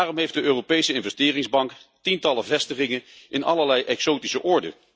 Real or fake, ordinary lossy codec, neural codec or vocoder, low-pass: real; none; none; none